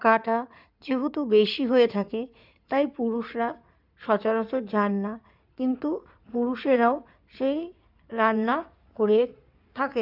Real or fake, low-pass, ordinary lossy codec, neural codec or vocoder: fake; 5.4 kHz; none; codec, 16 kHz in and 24 kHz out, 2.2 kbps, FireRedTTS-2 codec